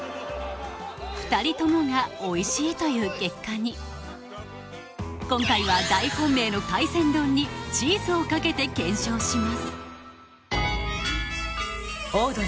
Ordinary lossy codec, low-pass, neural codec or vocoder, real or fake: none; none; none; real